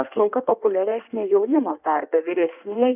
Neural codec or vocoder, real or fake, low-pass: codec, 16 kHz in and 24 kHz out, 1.1 kbps, FireRedTTS-2 codec; fake; 3.6 kHz